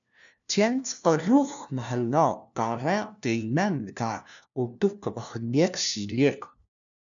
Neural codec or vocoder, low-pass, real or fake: codec, 16 kHz, 1 kbps, FunCodec, trained on LibriTTS, 50 frames a second; 7.2 kHz; fake